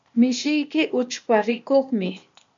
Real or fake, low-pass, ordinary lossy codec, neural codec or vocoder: fake; 7.2 kHz; MP3, 64 kbps; codec, 16 kHz, 0.8 kbps, ZipCodec